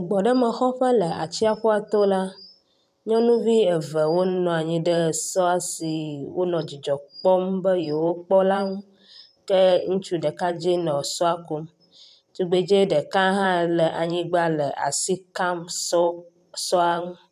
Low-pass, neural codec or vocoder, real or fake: 14.4 kHz; vocoder, 44.1 kHz, 128 mel bands every 512 samples, BigVGAN v2; fake